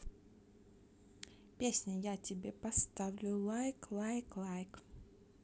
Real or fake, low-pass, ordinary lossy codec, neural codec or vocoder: real; none; none; none